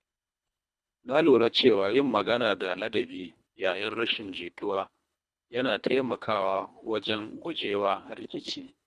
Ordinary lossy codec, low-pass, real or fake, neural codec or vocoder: none; none; fake; codec, 24 kHz, 1.5 kbps, HILCodec